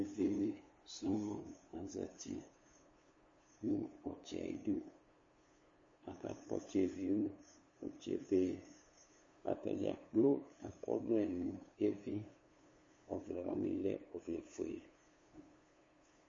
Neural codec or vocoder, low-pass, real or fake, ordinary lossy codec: codec, 16 kHz, 2 kbps, FunCodec, trained on LibriTTS, 25 frames a second; 7.2 kHz; fake; MP3, 32 kbps